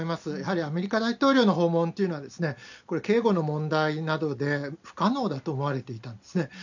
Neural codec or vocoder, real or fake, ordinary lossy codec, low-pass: none; real; none; 7.2 kHz